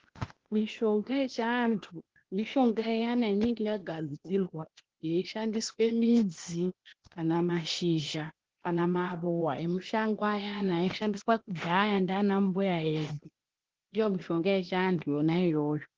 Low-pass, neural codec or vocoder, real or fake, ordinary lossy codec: 7.2 kHz; codec, 16 kHz, 0.8 kbps, ZipCodec; fake; Opus, 16 kbps